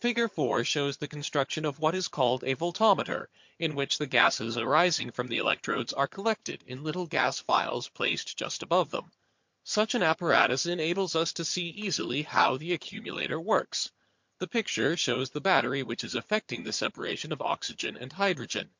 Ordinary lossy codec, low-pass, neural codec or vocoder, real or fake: MP3, 48 kbps; 7.2 kHz; vocoder, 22.05 kHz, 80 mel bands, HiFi-GAN; fake